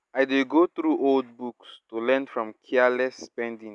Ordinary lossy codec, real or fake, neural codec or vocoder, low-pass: none; real; none; 10.8 kHz